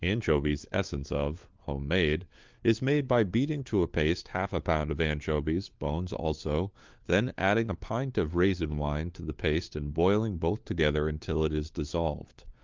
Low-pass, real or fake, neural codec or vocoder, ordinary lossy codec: 7.2 kHz; fake; codec, 16 kHz, 4 kbps, FunCodec, trained on LibriTTS, 50 frames a second; Opus, 32 kbps